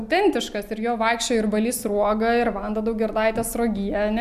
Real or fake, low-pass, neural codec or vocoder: real; 14.4 kHz; none